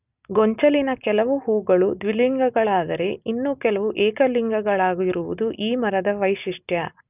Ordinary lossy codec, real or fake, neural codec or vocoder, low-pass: none; real; none; 3.6 kHz